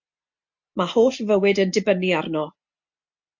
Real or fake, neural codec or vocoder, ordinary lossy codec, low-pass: real; none; MP3, 48 kbps; 7.2 kHz